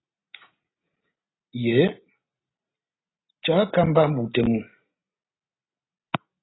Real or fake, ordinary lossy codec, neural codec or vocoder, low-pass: real; AAC, 16 kbps; none; 7.2 kHz